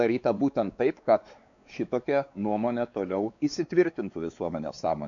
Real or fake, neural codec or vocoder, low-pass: fake; codec, 16 kHz, 4 kbps, X-Codec, WavLM features, trained on Multilingual LibriSpeech; 7.2 kHz